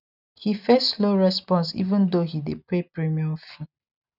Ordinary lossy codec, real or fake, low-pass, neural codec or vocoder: none; real; 5.4 kHz; none